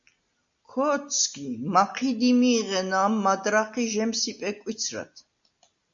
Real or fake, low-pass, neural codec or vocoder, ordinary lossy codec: real; 7.2 kHz; none; AAC, 64 kbps